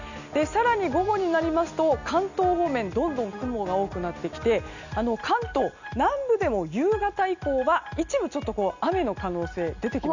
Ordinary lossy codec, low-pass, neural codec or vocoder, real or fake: none; 7.2 kHz; none; real